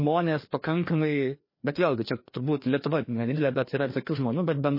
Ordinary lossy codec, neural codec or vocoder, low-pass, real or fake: MP3, 24 kbps; codec, 16 kHz, 2 kbps, FreqCodec, larger model; 5.4 kHz; fake